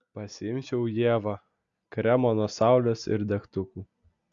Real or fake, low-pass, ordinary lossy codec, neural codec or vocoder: real; 7.2 kHz; Opus, 64 kbps; none